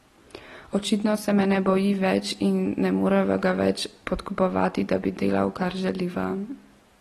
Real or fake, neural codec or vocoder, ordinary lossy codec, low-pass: real; none; AAC, 32 kbps; 19.8 kHz